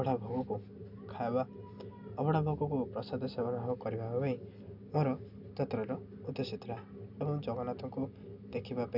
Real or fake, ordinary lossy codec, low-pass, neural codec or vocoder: real; none; 5.4 kHz; none